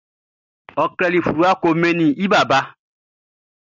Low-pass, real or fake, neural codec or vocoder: 7.2 kHz; real; none